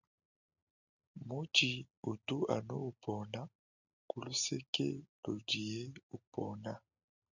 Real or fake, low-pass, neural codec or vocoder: real; 7.2 kHz; none